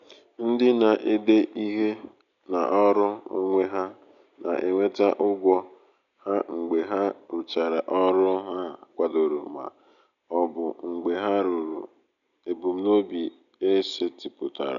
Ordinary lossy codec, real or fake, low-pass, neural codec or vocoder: none; real; 7.2 kHz; none